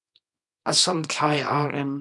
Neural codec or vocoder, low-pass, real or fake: codec, 24 kHz, 0.9 kbps, WavTokenizer, small release; 10.8 kHz; fake